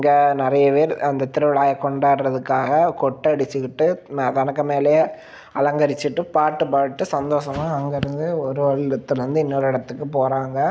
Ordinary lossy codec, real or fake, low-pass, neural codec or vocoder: none; real; none; none